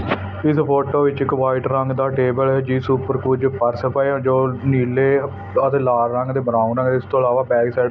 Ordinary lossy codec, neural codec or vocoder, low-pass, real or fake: none; none; none; real